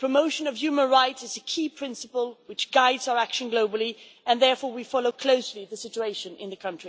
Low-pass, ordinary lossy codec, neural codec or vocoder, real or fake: none; none; none; real